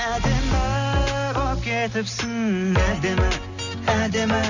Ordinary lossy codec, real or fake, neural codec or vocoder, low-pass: none; real; none; 7.2 kHz